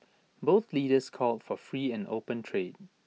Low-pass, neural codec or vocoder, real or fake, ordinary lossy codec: none; none; real; none